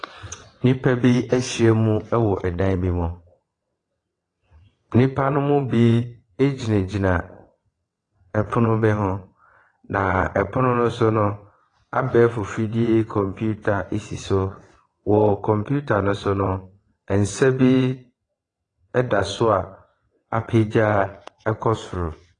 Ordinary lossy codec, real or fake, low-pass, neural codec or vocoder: AAC, 32 kbps; fake; 9.9 kHz; vocoder, 22.05 kHz, 80 mel bands, WaveNeXt